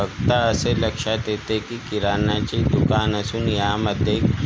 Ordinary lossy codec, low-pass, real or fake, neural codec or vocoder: none; none; real; none